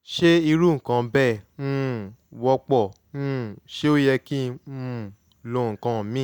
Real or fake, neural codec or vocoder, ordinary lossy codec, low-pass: real; none; none; none